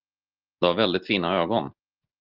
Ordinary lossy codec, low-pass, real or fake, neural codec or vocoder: Opus, 24 kbps; 5.4 kHz; real; none